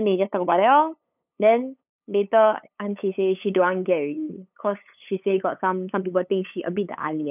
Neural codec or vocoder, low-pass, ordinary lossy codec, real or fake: codec, 16 kHz, 8 kbps, FunCodec, trained on LibriTTS, 25 frames a second; 3.6 kHz; none; fake